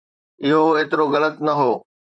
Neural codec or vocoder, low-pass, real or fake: vocoder, 44.1 kHz, 128 mel bands, Pupu-Vocoder; 9.9 kHz; fake